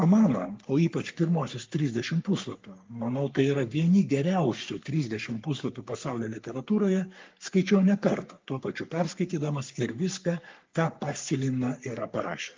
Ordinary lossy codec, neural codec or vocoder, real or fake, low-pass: Opus, 32 kbps; codec, 44.1 kHz, 3.4 kbps, Pupu-Codec; fake; 7.2 kHz